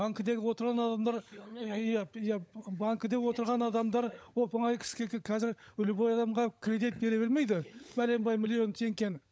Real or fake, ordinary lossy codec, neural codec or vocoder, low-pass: fake; none; codec, 16 kHz, 16 kbps, FunCodec, trained on LibriTTS, 50 frames a second; none